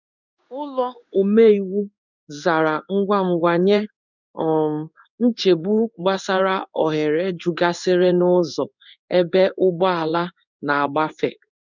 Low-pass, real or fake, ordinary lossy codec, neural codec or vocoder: 7.2 kHz; fake; none; codec, 16 kHz in and 24 kHz out, 1 kbps, XY-Tokenizer